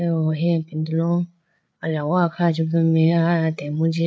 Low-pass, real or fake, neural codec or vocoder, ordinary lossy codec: none; fake; codec, 16 kHz, 8 kbps, FreqCodec, larger model; none